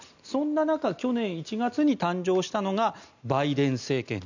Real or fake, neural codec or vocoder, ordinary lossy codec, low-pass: real; none; none; 7.2 kHz